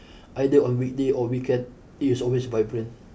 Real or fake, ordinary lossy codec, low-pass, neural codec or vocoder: real; none; none; none